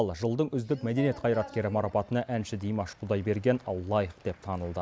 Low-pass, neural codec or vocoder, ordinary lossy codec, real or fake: none; none; none; real